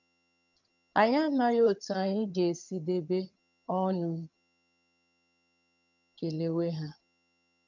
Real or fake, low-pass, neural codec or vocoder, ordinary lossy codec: fake; 7.2 kHz; vocoder, 22.05 kHz, 80 mel bands, HiFi-GAN; none